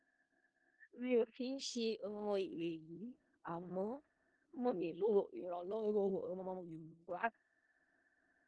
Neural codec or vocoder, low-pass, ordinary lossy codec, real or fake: codec, 16 kHz in and 24 kHz out, 0.4 kbps, LongCat-Audio-Codec, four codebook decoder; 9.9 kHz; Opus, 24 kbps; fake